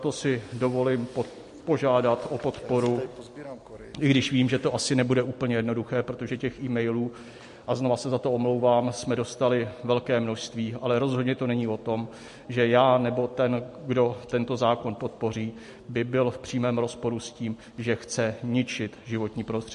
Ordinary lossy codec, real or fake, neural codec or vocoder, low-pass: MP3, 48 kbps; real; none; 14.4 kHz